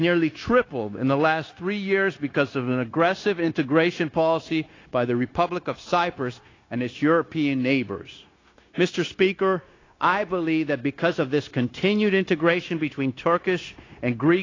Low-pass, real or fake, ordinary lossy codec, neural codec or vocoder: 7.2 kHz; fake; AAC, 32 kbps; codec, 16 kHz, 0.9 kbps, LongCat-Audio-Codec